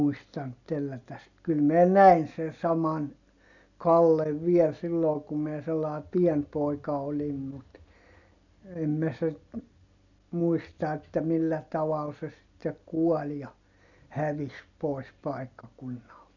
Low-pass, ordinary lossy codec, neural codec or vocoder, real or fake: 7.2 kHz; none; none; real